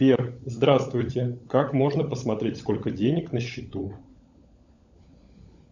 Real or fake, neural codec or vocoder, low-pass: fake; codec, 16 kHz, 16 kbps, FunCodec, trained on LibriTTS, 50 frames a second; 7.2 kHz